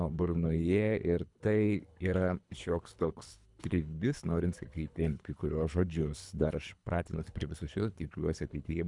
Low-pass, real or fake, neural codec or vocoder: 10.8 kHz; fake; codec, 24 kHz, 3 kbps, HILCodec